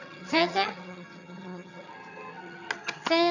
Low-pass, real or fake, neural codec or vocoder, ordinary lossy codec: 7.2 kHz; fake; vocoder, 22.05 kHz, 80 mel bands, HiFi-GAN; none